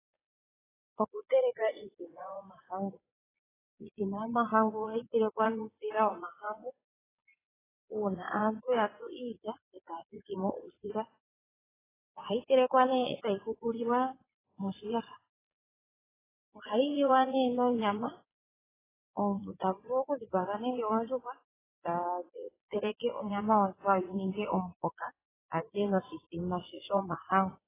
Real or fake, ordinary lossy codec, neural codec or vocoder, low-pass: fake; AAC, 16 kbps; vocoder, 22.05 kHz, 80 mel bands, Vocos; 3.6 kHz